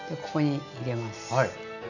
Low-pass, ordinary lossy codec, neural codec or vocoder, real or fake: 7.2 kHz; none; none; real